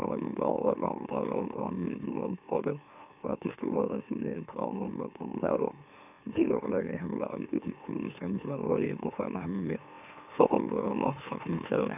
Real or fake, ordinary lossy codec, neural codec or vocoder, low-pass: fake; none; autoencoder, 44.1 kHz, a latent of 192 numbers a frame, MeloTTS; 3.6 kHz